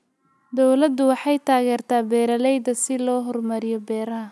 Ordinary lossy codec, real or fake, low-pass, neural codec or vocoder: none; real; none; none